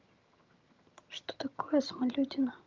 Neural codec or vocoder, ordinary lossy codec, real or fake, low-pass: vocoder, 22.05 kHz, 80 mel bands, HiFi-GAN; Opus, 24 kbps; fake; 7.2 kHz